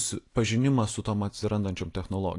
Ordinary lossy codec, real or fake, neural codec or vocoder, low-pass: AAC, 48 kbps; real; none; 10.8 kHz